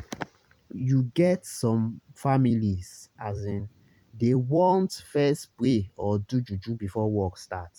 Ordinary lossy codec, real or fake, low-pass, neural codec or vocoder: none; fake; 19.8 kHz; vocoder, 44.1 kHz, 128 mel bands every 256 samples, BigVGAN v2